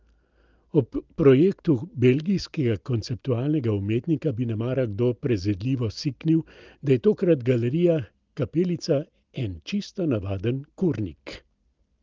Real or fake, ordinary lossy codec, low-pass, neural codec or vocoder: real; Opus, 24 kbps; 7.2 kHz; none